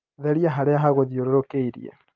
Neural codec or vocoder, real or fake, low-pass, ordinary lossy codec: none; real; 7.2 kHz; Opus, 32 kbps